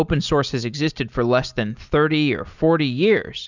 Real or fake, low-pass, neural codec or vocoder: real; 7.2 kHz; none